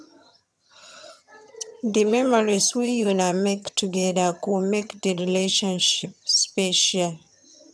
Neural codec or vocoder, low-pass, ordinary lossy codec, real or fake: vocoder, 22.05 kHz, 80 mel bands, HiFi-GAN; none; none; fake